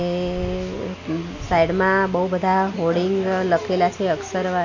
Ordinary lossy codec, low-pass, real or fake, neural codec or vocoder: AAC, 48 kbps; 7.2 kHz; real; none